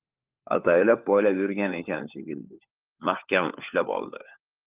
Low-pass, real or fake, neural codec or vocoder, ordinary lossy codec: 3.6 kHz; fake; codec, 16 kHz, 4 kbps, FunCodec, trained on LibriTTS, 50 frames a second; Opus, 24 kbps